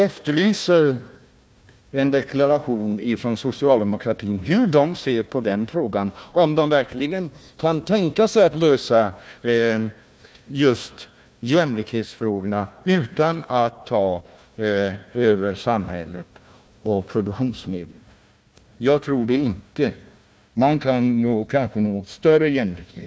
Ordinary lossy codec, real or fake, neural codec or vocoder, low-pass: none; fake; codec, 16 kHz, 1 kbps, FunCodec, trained on Chinese and English, 50 frames a second; none